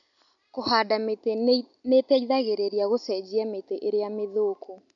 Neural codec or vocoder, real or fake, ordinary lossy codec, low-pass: none; real; none; 7.2 kHz